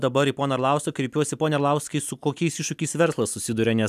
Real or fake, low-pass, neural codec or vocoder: real; 14.4 kHz; none